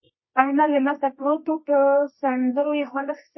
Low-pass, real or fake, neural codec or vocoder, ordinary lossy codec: 7.2 kHz; fake; codec, 24 kHz, 0.9 kbps, WavTokenizer, medium music audio release; MP3, 24 kbps